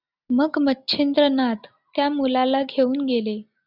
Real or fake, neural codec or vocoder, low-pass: real; none; 5.4 kHz